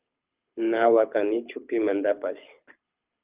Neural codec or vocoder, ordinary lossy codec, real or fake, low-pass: codec, 24 kHz, 6 kbps, HILCodec; Opus, 24 kbps; fake; 3.6 kHz